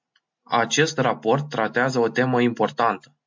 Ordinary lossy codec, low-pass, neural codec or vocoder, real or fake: MP3, 64 kbps; 7.2 kHz; none; real